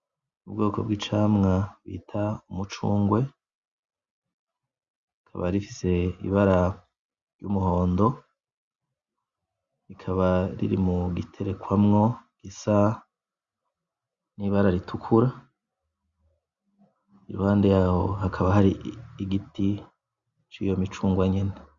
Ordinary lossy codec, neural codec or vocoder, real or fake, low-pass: Opus, 64 kbps; none; real; 7.2 kHz